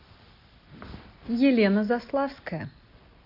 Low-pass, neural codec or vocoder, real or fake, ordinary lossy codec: 5.4 kHz; none; real; AAC, 48 kbps